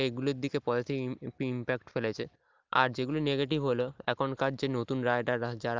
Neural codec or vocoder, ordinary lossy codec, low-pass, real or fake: none; Opus, 24 kbps; 7.2 kHz; real